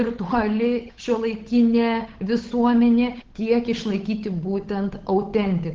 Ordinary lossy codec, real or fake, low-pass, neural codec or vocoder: Opus, 16 kbps; fake; 7.2 kHz; codec, 16 kHz, 8 kbps, FunCodec, trained on Chinese and English, 25 frames a second